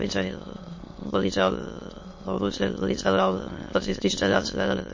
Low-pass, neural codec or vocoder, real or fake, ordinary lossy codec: 7.2 kHz; autoencoder, 22.05 kHz, a latent of 192 numbers a frame, VITS, trained on many speakers; fake; MP3, 32 kbps